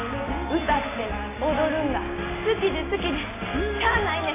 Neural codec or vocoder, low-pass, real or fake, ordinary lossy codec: none; 3.6 kHz; real; AAC, 16 kbps